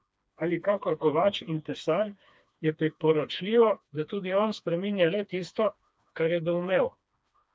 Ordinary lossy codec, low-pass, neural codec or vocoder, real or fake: none; none; codec, 16 kHz, 2 kbps, FreqCodec, smaller model; fake